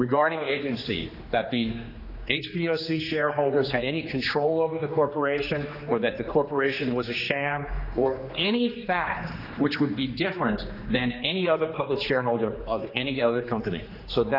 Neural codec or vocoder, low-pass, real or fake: codec, 16 kHz, 2 kbps, X-Codec, HuBERT features, trained on general audio; 5.4 kHz; fake